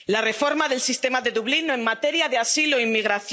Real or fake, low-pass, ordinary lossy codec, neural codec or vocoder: real; none; none; none